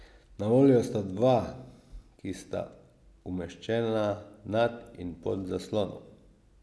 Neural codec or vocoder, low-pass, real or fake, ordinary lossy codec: none; none; real; none